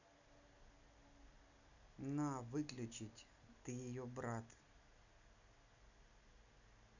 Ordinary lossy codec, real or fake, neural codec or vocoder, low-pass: none; real; none; 7.2 kHz